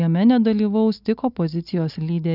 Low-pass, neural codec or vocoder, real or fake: 5.4 kHz; none; real